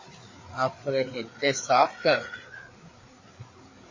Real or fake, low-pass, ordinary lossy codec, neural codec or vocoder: fake; 7.2 kHz; MP3, 32 kbps; codec, 16 kHz, 4 kbps, FreqCodec, larger model